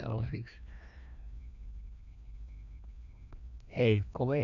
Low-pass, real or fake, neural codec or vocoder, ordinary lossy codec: 7.2 kHz; fake; codec, 16 kHz, 1 kbps, FreqCodec, larger model; none